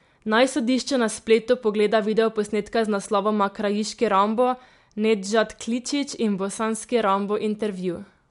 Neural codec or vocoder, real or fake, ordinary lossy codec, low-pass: none; real; MP3, 64 kbps; 10.8 kHz